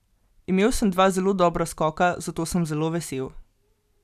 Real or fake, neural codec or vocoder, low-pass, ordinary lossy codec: real; none; 14.4 kHz; none